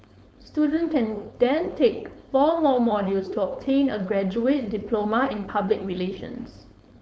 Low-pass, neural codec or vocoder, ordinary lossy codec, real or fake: none; codec, 16 kHz, 4.8 kbps, FACodec; none; fake